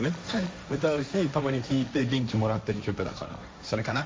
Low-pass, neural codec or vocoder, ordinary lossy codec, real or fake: none; codec, 16 kHz, 1.1 kbps, Voila-Tokenizer; none; fake